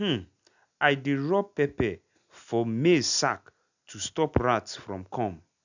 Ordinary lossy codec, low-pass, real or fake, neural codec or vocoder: none; 7.2 kHz; real; none